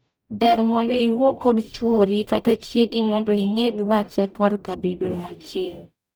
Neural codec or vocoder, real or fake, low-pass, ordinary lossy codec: codec, 44.1 kHz, 0.9 kbps, DAC; fake; none; none